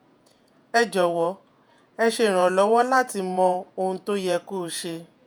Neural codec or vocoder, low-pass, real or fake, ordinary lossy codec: vocoder, 48 kHz, 128 mel bands, Vocos; none; fake; none